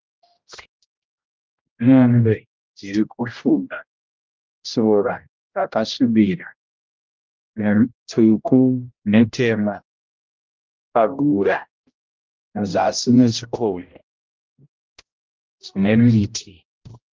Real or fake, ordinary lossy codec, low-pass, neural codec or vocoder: fake; Opus, 24 kbps; 7.2 kHz; codec, 16 kHz, 0.5 kbps, X-Codec, HuBERT features, trained on general audio